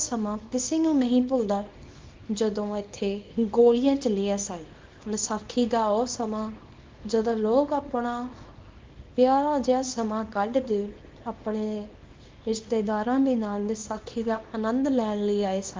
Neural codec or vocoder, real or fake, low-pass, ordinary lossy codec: codec, 24 kHz, 0.9 kbps, WavTokenizer, small release; fake; 7.2 kHz; Opus, 32 kbps